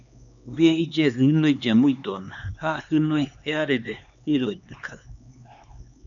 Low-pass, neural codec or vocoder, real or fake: 7.2 kHz; codec, 16 kHz, 2 kbps, X-Codec, HuBERT features, trained on LibriSpeech; fake